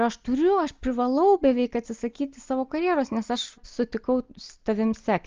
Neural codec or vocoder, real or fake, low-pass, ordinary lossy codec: none; real; 7.2 kHz; Opus, 24 kbps